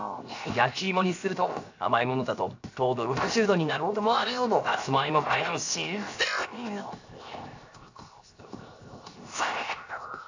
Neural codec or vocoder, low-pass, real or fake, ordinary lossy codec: codec, 16 kHz, 0.7 kbps, FocalCodec; 7.2 kHz; fake; AAC, 48 kbps